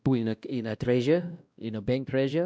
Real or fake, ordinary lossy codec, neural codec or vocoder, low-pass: fake; none; codec, 16 kHz, 1 kbps, X-Codec, WavLM features, trained on Multilingual LibriSpeech; none